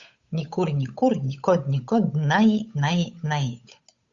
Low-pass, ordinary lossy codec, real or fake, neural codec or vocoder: 7.2 kHz; Opus, 64 kbps; fake; codec, 16 kHz, 8 kbps, FunCodec, trained on Chinese and English, 25 frames a second